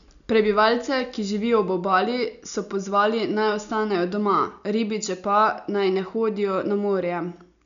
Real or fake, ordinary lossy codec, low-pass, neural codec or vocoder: real; none; 7.2 kHz; none